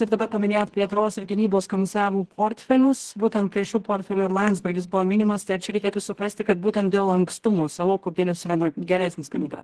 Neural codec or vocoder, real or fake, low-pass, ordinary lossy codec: codec, 24 kHz, 0.9 kbps, WavTokenizer, medium music audio release; fake; 10.8 kHz; Opus, 16 kbps